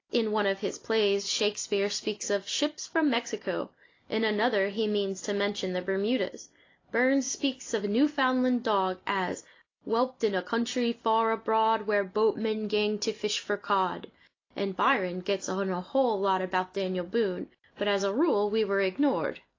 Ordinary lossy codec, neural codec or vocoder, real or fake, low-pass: AAC, 32 kbps; none; real; 7.2 kHz